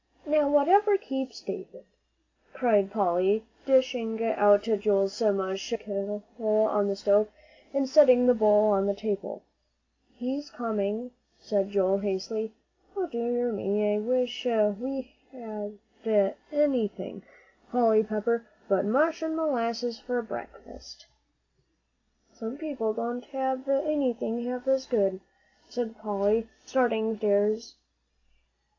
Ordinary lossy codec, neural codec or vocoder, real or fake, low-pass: MP3, 64 kbps; none; real; 7.2 kHz